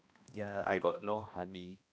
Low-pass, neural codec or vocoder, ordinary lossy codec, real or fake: none; codec, 16 kHz, 1 kbps, X-Codec, HuBERT features, trained on balanced general audio; none; fake